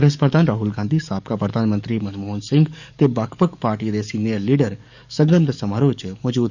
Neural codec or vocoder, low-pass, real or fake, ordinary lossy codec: codec, 44.1 kHz, 7.8 kbps, DAC; 7.2 kHz; fake; none